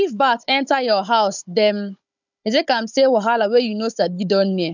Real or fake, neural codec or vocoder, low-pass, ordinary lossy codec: fake; codec, 16 kHz, 16 kbps, FunCodec, trained on Chinese and English, 50 frames a second; 7.2 kHz; none